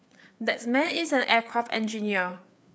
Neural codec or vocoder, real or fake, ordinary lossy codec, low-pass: codec, 16 kHz, 4 kbps, FreqCodec, larger model; fake; none; none